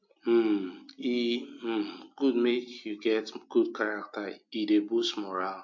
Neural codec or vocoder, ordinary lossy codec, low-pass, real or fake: none; MP3, 48 kbps; 7.2 kHz; real